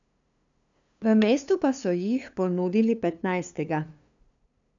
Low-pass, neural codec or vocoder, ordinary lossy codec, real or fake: 7.2 kHz; codec, 16 kHz, 2 kbps, FunCodec, trained on LibriTTS, 25 frames a second; none; fake